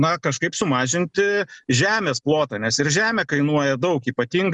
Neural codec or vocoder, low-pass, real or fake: none; 10.8 kHz; real